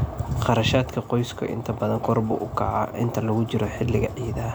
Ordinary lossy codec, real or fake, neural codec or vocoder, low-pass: none; real; none; none